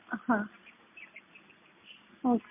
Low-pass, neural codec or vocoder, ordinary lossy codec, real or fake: 3.6 kHz; none; MP3, 32 kbps; real